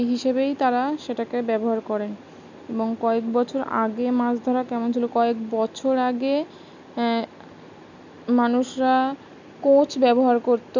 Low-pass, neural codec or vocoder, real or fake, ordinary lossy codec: 7.2 kHz; none; real; none